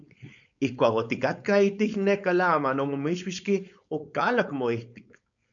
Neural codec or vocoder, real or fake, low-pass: codec, 16 kHz, 4.8 kbps, FACodec; fake; 7.2 kHz